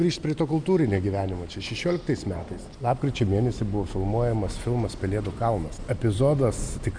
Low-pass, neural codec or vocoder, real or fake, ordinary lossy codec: 9.9 kHz; none; real; MP3, 96 kbps